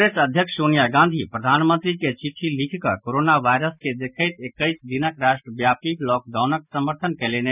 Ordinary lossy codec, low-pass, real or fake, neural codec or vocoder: none; 3.6 kHz; real; none